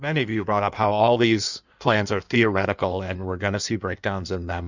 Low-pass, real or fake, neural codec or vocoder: 7.2 kHz; fake; codec, 16 kHz in and 24 kHz out, 1.1 kbps, FireRedTTS-2 codec